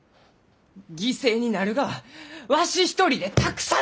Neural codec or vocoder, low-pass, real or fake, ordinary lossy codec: none; none; real; none